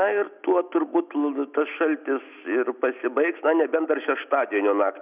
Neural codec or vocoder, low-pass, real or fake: none; 3.6 kHz; real